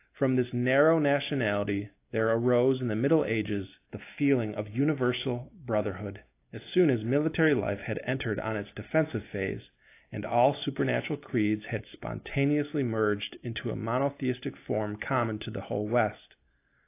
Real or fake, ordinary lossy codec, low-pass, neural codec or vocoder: real; AAC, 24 kbps; 3.6 kHz; none